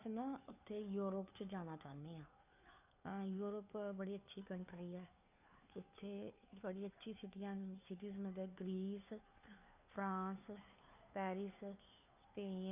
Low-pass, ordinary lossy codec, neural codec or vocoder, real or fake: 3.6 kHz; none; codec, 16 kHz, 2 kbps, FunCodec, trained on Chinese and English, 25 frames a second; fake